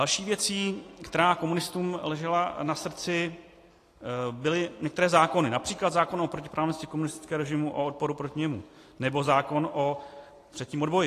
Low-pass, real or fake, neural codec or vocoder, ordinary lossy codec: 14.4 kHz; real; none; AAC, 48 kbps